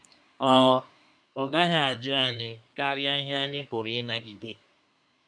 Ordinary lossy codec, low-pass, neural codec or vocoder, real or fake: none; 9.9 kHz; codec, 24 kHz, 1 kbps, SNAC; fake